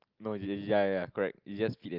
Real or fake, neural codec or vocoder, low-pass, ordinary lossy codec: real; none; 5.4 kHz; Opus, 24 kbps